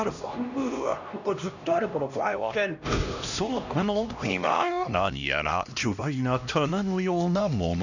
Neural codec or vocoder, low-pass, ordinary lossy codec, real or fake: codec, 16 kHz, 1 kbps, X-Codec, HuBERT features, trained on LibriSpeech; 7.2 kHz; none; fake